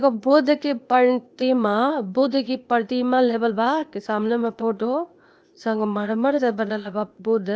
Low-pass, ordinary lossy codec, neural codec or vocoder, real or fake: none; none; codec, 16 kHz, 0.8 kbps, ZipCodec; fake